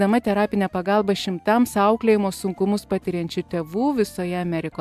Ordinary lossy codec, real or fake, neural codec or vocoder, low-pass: MP3, 96 kbps; real; none; 14.4 kHz